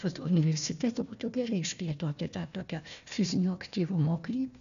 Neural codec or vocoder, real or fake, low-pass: codec, 16 kHz, 1 kbps, FunCodec, trained on Chinese and English, 50 frames a second; fake; 7.2 kHz